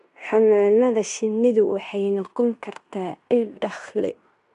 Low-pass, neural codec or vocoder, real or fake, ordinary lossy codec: 10.8 kHz; codec, 16 kHz in and 24 kHz out, 0.9 kbps, LongCat-Audio-Codec, fine tuned four codebook decoder; fake; none